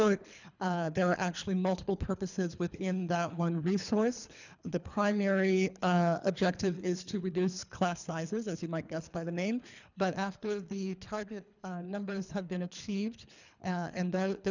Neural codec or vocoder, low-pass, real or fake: codec, 24 kHz, 3 kbps, HILCodec; 7.2 kHz; fake